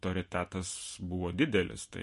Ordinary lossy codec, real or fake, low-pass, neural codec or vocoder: MP3, 48 kbps; real; 14.4 kHz; none